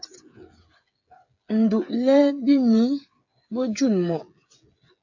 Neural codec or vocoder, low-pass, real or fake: codec, 16 kHz, 8 kbps, FreqCodec, smaller model; 7.2 kHz; fake